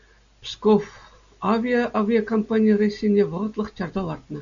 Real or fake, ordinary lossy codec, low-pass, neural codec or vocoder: real; MP3, 96 kbps; 7.2 kHz; none